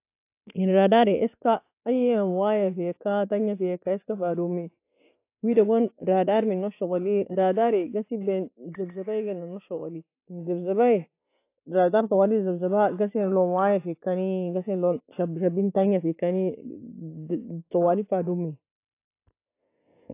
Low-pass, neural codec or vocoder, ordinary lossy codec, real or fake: 3.6 kHz; none; AAC, 24 kbps; real